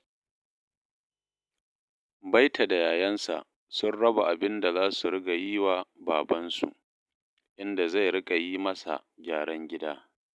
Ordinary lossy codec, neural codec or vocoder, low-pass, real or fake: none; none; none; real